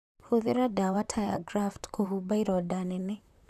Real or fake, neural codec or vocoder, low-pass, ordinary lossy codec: fake; vocoder, 44.1 kHz, 128 mel bands, Pupu-Vocoder; 14.4 kHz; none